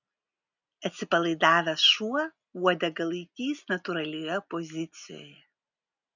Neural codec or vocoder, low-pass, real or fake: none; 7.2 kHz; real